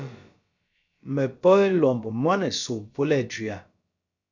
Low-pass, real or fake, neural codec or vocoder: 7.2 kHz; fake; codec, 16 kHz, about 1 kbps, DyCAST, with the encoder's durations